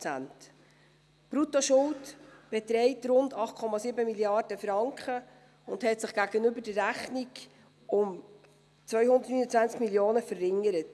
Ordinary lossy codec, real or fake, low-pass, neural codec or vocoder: none; real; none; none